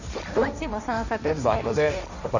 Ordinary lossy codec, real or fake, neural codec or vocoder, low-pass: none; fake; codec, 16 kHz, 1.1 kbps, Voila-Tokenizer; 7.2 kHz